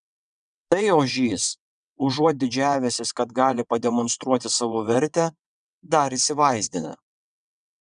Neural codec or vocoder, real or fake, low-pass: vocoder, 22.05 kHz, 80 mel bands, WaveNeXt; fake; 9.9 kHz